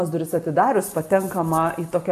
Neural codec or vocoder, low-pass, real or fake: none; 14.4 kHz; real